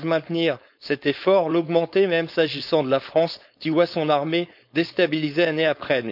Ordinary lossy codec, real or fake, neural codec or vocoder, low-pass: none; fake; codec, 16 kHz, 4.8 kbps, FACodec; 5.4 kHz